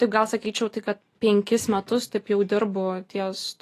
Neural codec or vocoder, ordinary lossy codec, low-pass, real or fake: none; AAC, 48 kbps; 14.4 kHz; real